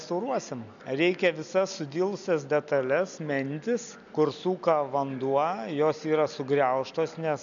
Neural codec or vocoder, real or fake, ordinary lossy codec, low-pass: none; real; MP3, 96 kbps; 7.2 kHz